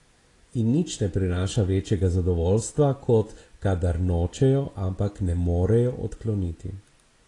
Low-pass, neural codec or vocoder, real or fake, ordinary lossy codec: 10.8 kHz; none; real; AAC, 32 kbps